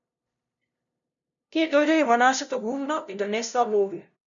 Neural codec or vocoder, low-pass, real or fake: codec, 16 kHz, 0.5 kbps, FunCodec, trained on LibriTTS, 25 frames a second; 7.2 kHz; fake